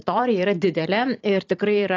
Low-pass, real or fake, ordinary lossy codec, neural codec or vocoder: 7.2 kHz; fake; AAC, 48 kbps; vocoder, 44.1 kHz, 128 mel bands every 512 samples, BigVGAN v2